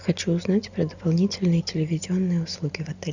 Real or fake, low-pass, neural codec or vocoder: real; 7.2 kHz; none